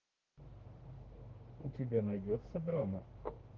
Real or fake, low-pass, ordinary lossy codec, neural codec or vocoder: fake; 7.2 kHz; Opus, 16 kbps; autoencoder, 48 kHz, 32 numbers a frame, DAC-VAE, trained on Japanese speech